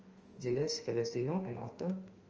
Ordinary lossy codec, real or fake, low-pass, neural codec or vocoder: Opus, 24 kbps; fake; 7.2 kHz; autoencoder, 48 kHz, 32 numbers a frame, DAC-VAE, trained on Japanese speech